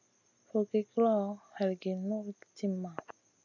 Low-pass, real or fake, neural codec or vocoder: 7.2 kHz; real; none